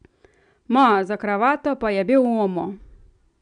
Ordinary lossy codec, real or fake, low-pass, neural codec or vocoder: none; real; 9.9 kHz; none